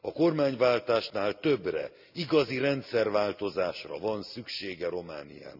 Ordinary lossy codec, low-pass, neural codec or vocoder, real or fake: none; 5.4 kHz; none; real